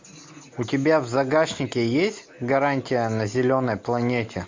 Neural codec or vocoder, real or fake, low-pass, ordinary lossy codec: none; real; 7.2 kHz; MP3, 48 kbps